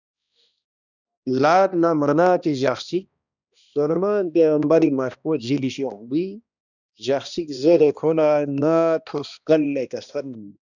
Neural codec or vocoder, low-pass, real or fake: codec, 16 kHz, 1 kbps, X-Codec, HuBERT features, trained on balanced general audio; 7.2 kHz; fake